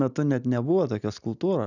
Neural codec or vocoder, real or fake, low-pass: none; real; 7.2 kHz